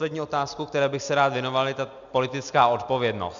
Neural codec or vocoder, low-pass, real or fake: none; 7.2 kHz; real